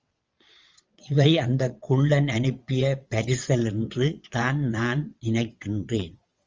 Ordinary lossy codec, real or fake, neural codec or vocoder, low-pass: Opus, 32 kbps; fake; vocoder, 22.05 kHz, 80 mel bands, WaveNeXt; 7.2 kHz